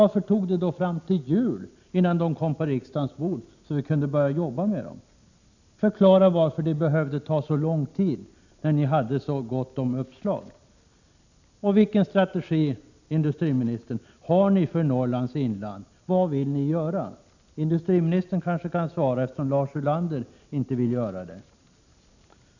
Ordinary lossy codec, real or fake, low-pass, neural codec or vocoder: none; real; 7.2 kHz; none